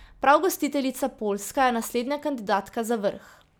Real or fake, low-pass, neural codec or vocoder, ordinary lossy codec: real; none; none; none